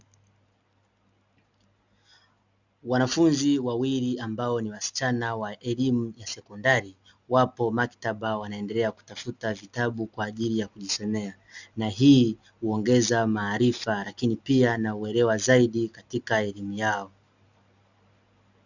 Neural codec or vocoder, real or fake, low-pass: none; real; 7.2 kHz